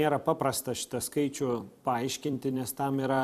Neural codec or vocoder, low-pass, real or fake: vocoder, 44.1 kHz, 128 mel bands every 256 samples, BigVGAN v2; 14.4 kHz; fake